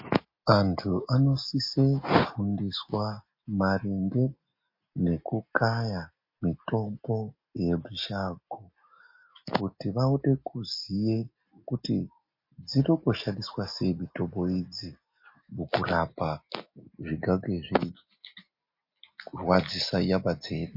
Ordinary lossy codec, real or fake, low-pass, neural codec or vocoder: MP3, 32 kbps; real; 5.4 kHz; none